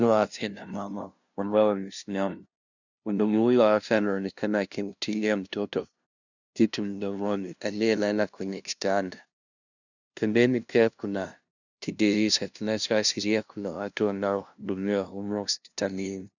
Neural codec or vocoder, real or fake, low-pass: codec, 16 kHz, 0.5 kbps, FunCodec, trained on LibriTTS, 25 frames a second; fake; 7.2 kHz